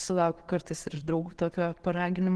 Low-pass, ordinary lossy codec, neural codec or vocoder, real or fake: 10.8 kHz; Opus, 16 kbps; none; real